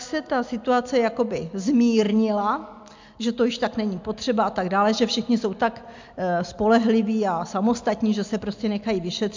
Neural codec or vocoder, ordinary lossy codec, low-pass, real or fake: none; MP3, 64 kbps; 7.2 kHz; real